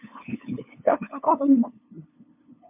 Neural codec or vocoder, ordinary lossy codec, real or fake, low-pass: codec, 16 kHz, 2 kbps, FunCodec, trained on LibriTTS, 25 frames a second; MP3, 32 kbps; fake; 3.6 kHz